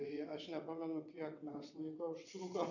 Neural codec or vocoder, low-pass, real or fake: vocoder, 22.05 kHz, 80 mel bands, WaveNeXt; 7.2 kHz; fake